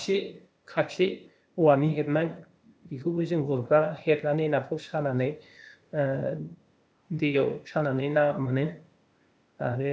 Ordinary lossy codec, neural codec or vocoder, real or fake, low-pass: none; codec, 16 kHz, 0.8 kbps, ZipCodec; fake; none